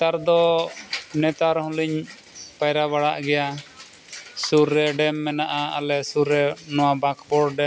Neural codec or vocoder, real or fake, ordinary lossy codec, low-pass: none; real; none; none